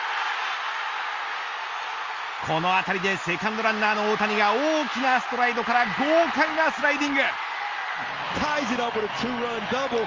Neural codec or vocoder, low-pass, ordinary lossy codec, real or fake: none; 7.2 kHz; Opus, 32 kbps; real